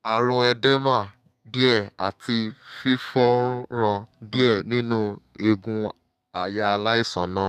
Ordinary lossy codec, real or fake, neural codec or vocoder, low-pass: none; fake; codec, 32 kHz, 1.9 kbps, SNAC; 14.4 kHz